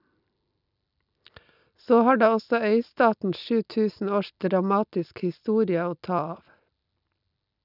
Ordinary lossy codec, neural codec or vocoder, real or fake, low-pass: none; none; real; 5.4 kHz